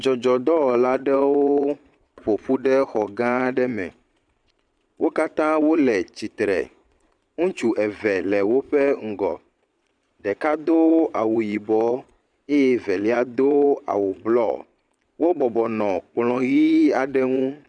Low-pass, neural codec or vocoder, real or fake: 9.9 kHz; vocoder, 22.05 kHz, 80 mel bands, Vocos; fake